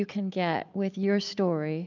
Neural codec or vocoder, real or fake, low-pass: none; real; 7.2 kHz